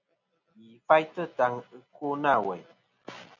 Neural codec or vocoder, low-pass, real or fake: none; 7.2 kHz; real